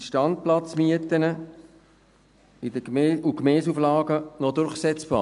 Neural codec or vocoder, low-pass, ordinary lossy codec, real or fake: none; 10.8 kHz; none; real